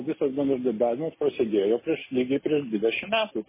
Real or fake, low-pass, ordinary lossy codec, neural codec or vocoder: real; 3.6 kHz; MP3, 16 kbps; none